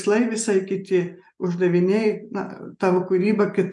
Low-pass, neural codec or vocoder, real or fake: 10.8 kHz; none; real